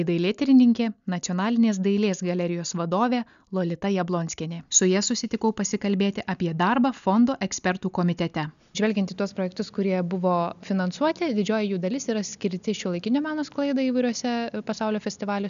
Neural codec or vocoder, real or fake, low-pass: none; real; 7.2 kHz